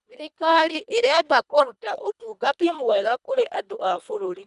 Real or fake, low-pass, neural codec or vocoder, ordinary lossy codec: fake; 10.8 kHz; codec, 24 kHz, 1.5 kbps, HILCodec; none